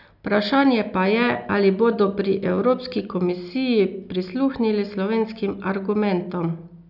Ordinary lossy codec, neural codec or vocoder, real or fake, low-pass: none; none; real; 5.4 kHz